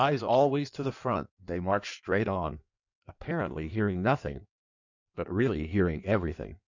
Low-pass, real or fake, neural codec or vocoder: 7.2 kHz; fake; codec, 16 kHz in and 24 kHz out, 1.1 kbps, FireRedTTS-2 codec